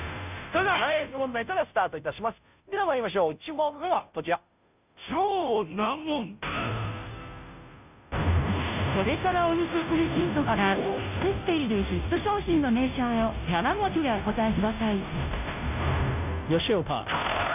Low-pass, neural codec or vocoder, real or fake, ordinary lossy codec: 3.6 kHz; codec, 16 kHz, 0.5 kbps, FunCodec, trained on Chinese and English, 25 frames a second; fake; none